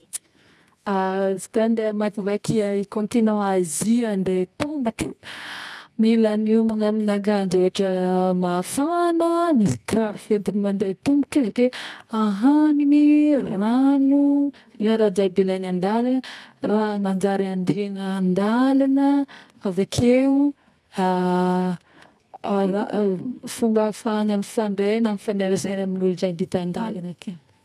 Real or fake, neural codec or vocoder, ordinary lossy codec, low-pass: fake; codec, 24 kHz, 0.9 kbps, WavTokenizer, medium music audio release; none; none